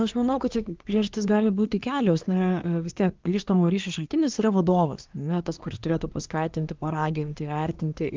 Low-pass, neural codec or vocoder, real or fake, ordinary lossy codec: 7.2 kHz; codec, 24 kHz, 1 kbps, SNAC; fake; Opus, 32 kbps